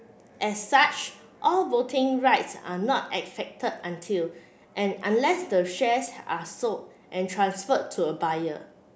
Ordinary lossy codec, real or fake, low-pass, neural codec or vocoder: none; real; none; none